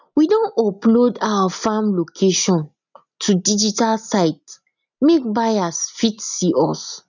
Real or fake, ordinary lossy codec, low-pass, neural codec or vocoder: real; none; 7.2 kHz; none